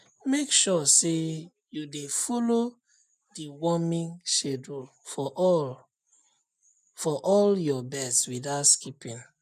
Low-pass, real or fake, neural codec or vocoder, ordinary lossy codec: 14.4 kHz; real; none; none